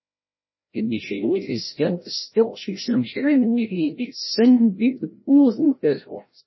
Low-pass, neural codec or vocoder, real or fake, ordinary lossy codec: 7.2 kHz; codec, 16 kHz, 0.5 kbps, FreqCodec, larger model; fake; MP3, 24 kbps